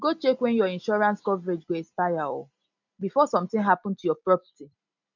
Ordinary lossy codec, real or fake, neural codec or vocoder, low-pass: none; real; none; 7.2 kHz